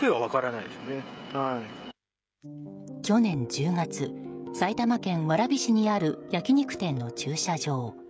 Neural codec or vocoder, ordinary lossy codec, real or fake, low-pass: codec, 16 kHz, 16 kbps, FreqCodec, smaller model; none; fake; none